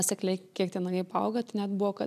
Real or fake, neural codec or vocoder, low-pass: real; none; 14.4 kHz